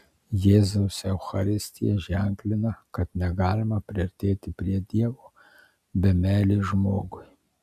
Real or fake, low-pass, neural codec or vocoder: real; 14.4 kHz; none